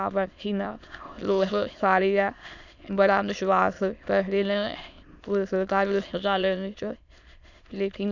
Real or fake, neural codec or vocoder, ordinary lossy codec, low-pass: fake; autoencoder, 22.05 kHz, a latent of 192 numbers a frame, VITS, trained on many speakers; none; 7.2 kHz